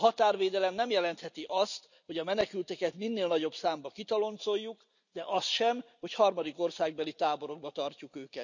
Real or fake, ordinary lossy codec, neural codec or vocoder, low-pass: real; none; none; 7.2 kHz